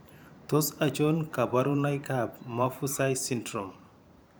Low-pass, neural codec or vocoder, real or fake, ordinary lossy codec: none; vocoder, 44.1 kHz, 128 mel bands every 512 samples, BigVGAN v2; fake; none